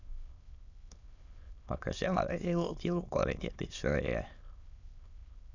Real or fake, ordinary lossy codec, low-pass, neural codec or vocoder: fake; none; 7.2 kHz; autoencoder, 22.05 kHz, a latent of 192 numbers a frame, VITS, trained on many speakers